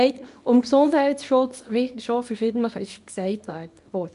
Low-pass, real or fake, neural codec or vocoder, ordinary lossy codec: 10.8 kHz; fake; codec, 24 kHz, 0.9 kbps, WavTokenizer, small release; none